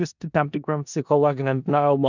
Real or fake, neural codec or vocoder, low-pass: fake; codec, 16 kHz in and 24 kHz out, 0.4 kbps, LongCat-Audio-Codec, four codebook decoder; 7.2 kHz